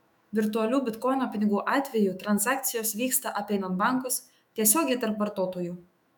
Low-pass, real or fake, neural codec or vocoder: 19.8 kHz; fake; autoencoder, 48 kHz, 128 numbers a frame, DAC-VAE, trained on Japanese speech